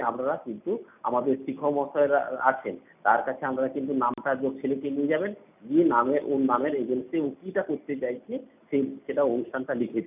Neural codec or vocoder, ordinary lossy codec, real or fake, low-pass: none; none; real; 3.6 kHz